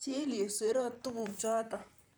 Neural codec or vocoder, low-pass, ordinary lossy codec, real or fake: vocoder, 44.1 kHz, 128 mel bands, Pupu-Vocoder; none; none; fake